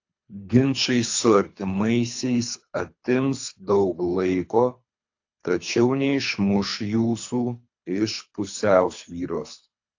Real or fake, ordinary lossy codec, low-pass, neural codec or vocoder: fake; AAC, 48 kbps; 7.2 kHz; codec, 24 kHz, 3 kbps, HILCodec